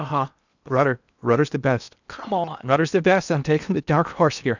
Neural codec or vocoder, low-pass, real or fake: codec, 16 kHz in and 24 kHz out, 0.8 kbps, FocalCodec, streaming, 65536 codes; 7.2 kHz; fake